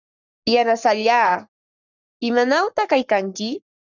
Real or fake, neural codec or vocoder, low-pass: fake; codec, 44.1 kHz, 7.8 kbps, Pupu-Codec; 7.2 kHz